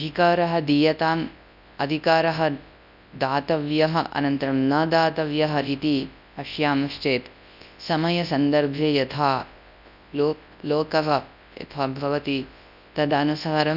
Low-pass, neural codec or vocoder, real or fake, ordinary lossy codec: 5.4 kHz; codec, 24 kHz, 0.9 kbps, WavTokenizer, large speech release; fake; none